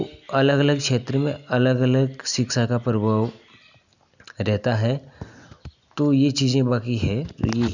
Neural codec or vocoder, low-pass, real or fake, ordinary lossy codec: none; 7.2 kHz; real; none